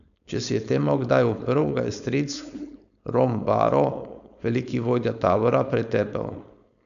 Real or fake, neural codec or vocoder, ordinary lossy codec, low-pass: fake; codec, 16 kHz, 4.8 kbps, FACodec; none; 7.2 kHz